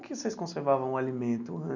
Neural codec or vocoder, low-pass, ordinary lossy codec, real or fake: none; 7.2 kHz; none; real